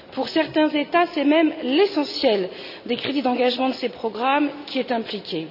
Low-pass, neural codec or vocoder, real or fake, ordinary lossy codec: 5.4 kHz; none; real; none